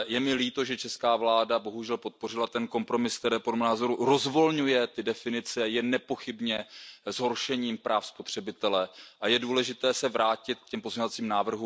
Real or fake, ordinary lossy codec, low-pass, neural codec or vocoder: real; none; none; none